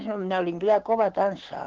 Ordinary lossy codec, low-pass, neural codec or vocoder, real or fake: Opus, 16 kbps; 7.2 kHz; codec, 16 kHz, 6 kbps, DAC; fake